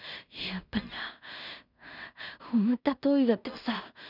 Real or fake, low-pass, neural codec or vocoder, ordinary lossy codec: fake; 5.4 kHz; codec, 16 kHz in and 24 kHz out, 0.4 kbps, LongCat-Audio-Codec, two codebook decoder; none